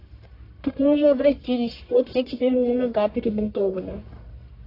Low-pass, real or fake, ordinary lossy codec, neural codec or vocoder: 5.4 kHz; fake; AAC, 32 kbps; codec, 44.1 kHz, 1.7 kbps, Pupu-Codec